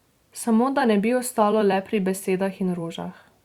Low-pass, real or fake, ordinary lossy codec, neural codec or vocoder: 19.8 kHz; fake; Opus, 64 kbps; vocoder, 44.1 kHz, 128 mel bands every 256 samples, BigVGAN v2